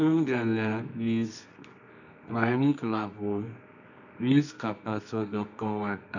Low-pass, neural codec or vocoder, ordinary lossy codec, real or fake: 7.2 kHz; codec, 24 kHz, 0.9 kbps, WavTokenizer, medium music audio release; none; fake